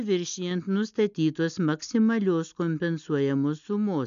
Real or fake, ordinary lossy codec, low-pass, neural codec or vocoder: real; MP3, 96 kbps; 7.2 kHz; none